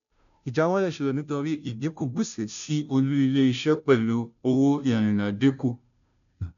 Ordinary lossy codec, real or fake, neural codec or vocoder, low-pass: none; fake; codec, 16 kHz, 0.5 kbps, FunCodec, trained on Chinese and English, 25 frames a second; 7.2 kHz